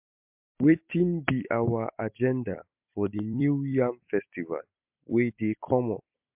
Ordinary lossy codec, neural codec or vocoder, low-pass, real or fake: MP3, 32 kbps; vocoder, 44.1 kHz, 128 mel bands every 256 samples, BigVGAN v2; 3.6 kHz; fake